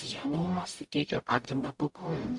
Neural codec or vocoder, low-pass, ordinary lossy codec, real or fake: codec, 44.1 kHz, 0.9 kbps, DAC; 10.8 kHz; AAC, 64 kbps; fake